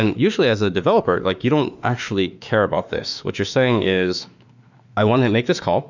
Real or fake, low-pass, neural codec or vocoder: fake; 7.2 kHz; autoencoder, 48 kHz, 32 numbers a frame, DAC-VAE, trained on Japanese speech